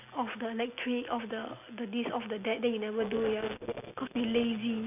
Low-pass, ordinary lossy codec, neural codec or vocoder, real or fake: 3.6 kHz; none; none; real